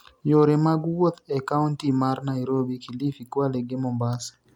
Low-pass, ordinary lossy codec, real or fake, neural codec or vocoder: 19.8 kHz; none; real; none